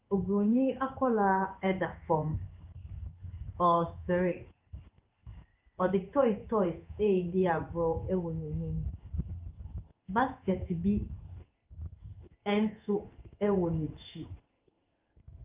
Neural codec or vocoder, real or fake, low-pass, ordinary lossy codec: codec, 16 kHz in and 24 kHz out, 1 kbps, XY-Tokenizer; fake; 3.6 kHz; Opus, 24 kbps